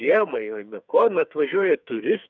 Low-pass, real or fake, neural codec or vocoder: 7.2 kHz; fake; codec, 44.1 kHz, 2.6 kbps, SNAC